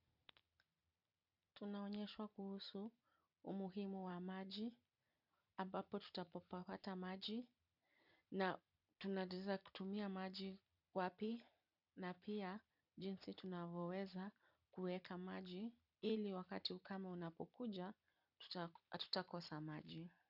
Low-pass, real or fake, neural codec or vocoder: 5.4 kHz; fake; vocoder, 44.1 kHz, 128 mel bands every 256 samples, BigVGAN v2